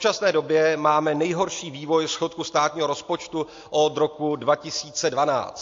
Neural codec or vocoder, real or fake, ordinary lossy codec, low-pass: none; real; AAC, 48 kbps; 7.2 kHz